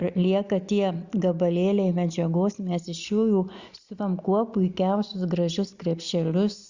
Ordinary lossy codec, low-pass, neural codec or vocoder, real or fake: Opus, 64 kbps; 7.2 kHz; none; real